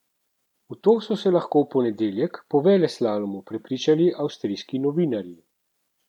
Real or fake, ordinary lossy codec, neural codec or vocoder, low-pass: real; none; none; 19.8 kHz